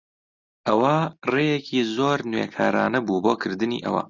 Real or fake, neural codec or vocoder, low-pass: real; none; 7.2 kHz